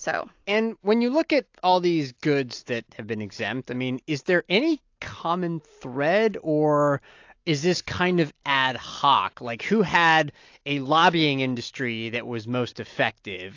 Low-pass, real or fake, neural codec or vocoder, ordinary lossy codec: 7.2 kHz; real; none; AAC, 48 kbps